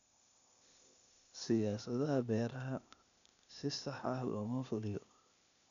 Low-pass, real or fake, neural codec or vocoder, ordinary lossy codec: 7.2 kHz; fake; codec, 16 kHz, 0.8 kbps, ZipCodec; none